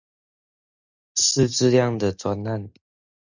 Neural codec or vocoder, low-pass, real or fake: none; 7.2 kHz; real